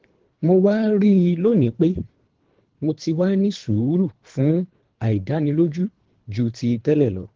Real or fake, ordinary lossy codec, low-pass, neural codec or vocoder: fake; Opus, 16 kbps; 7.2 kHz; codec, 24 kHz, 3 kbps, HILCodec